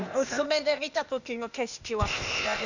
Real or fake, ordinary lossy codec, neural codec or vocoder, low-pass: fake; none; codec, 16 kHz, 0.8 kbps, ZipCodec; 7.2 kHz